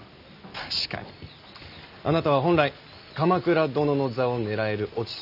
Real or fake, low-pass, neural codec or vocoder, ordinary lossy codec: real; 5.4 kHz; none; none